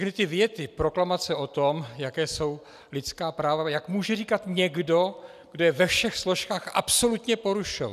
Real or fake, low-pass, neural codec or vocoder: real; 14.4 kHz; none